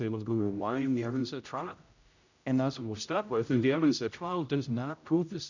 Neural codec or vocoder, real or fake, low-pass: codec, 16 kHz, 0.5 kbps, X-Codec, HuBERT features, trained on general audio; fake; 7.2 kHz